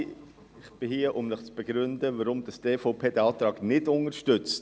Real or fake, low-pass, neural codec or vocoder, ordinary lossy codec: real; none; none; none